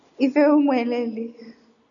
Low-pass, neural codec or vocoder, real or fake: 7.2 kHz; none; real